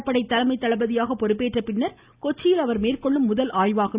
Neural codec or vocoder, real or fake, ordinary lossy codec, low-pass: none; real; Opus, 64 kbps; 3.6 kHz